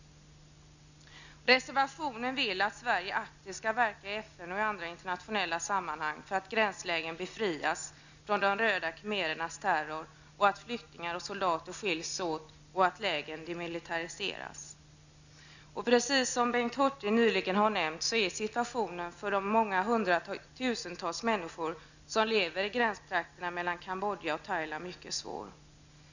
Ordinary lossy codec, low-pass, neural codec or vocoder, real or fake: none; 7.2 kHz; none; real